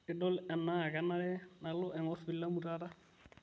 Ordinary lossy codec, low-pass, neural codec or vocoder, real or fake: none; none; none; real